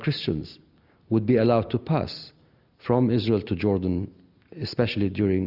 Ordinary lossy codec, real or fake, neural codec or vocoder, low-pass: Opus, 64 kbps; real; none; 5.4 kHz